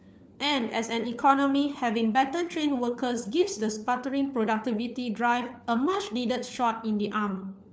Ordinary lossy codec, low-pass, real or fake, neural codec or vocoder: none; none; fake; codec, 16 kHz, 4 kbps, FunCodec, trained on LibriTTS, 50 frames a second